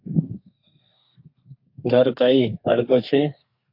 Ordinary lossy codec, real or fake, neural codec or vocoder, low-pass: AAC, 32 kbps; fake; codec, 32 kHz, 1.9 kbps, SNAC; 5.4 kHz